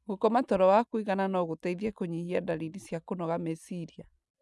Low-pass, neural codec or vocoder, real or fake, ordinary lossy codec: none; none; real; none